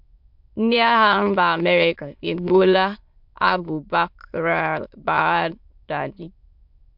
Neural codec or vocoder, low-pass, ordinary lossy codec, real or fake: autoencoder, 22.05 kHz, a latent of 192 numbers a frame, VITS, trained on many speakers; 5.4 kHz; MP3, 48 kbps; fake